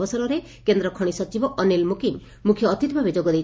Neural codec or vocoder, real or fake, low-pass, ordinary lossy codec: none; real; none; none